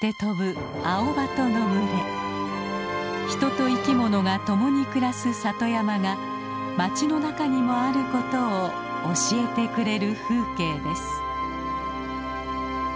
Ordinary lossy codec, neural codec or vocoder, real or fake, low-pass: none; none; real; none